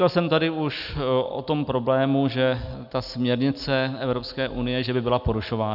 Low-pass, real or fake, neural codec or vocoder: 5.4 kHz; real; none